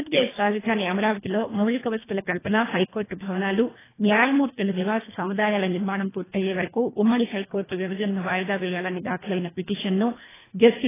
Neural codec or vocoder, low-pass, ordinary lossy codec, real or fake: codec, 24 kHz, 1.5 kbps, HILCodec; 3.6 kHz; AAC, 16 kbps; fake